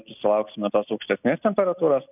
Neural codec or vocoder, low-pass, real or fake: none; 3.6 kHz; real